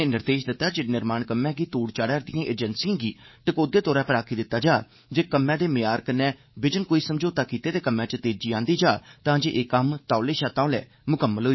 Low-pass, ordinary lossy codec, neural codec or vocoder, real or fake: 7.2 kHz; MP3, 24 kbps; codec, 24 kHz, 3.1 kbps, DualCodec; fake